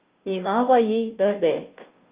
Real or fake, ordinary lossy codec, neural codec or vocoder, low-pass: fake; Opus, 32 kbps; codec, 16 kHz, 0.5 kbps, FunCodec, trained on Chinese and English, 25 frames a second; 3.6 kHz